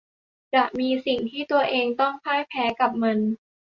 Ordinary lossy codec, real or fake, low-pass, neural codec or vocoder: Opus, 64 kbps; real; 7.2 kHz; none